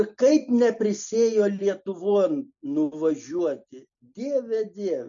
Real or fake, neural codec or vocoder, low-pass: real; none; 7.2 kHz